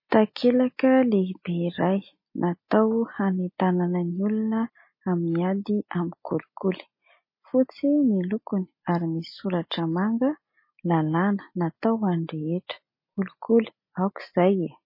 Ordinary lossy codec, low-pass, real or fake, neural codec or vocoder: MP3, 24 kbps; 5.4 kHz; real; none